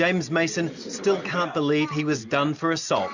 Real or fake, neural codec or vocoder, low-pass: real; none; 7.2 kHz